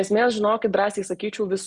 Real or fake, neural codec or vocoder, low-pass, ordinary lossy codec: real; none; 10.8 kHz; Opus, 64 kbps